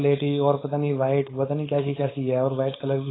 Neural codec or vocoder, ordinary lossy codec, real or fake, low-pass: codec, 16 kHz, 4.8 kbps, FACodec; AAC, 16 kbps; fake; 7.2 kHz